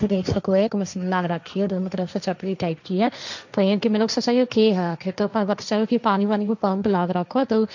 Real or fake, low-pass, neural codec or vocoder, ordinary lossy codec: fake; 7.2 kHz; codec, 16 kHz, 1.1 kbps, Voila-Tokenizer; none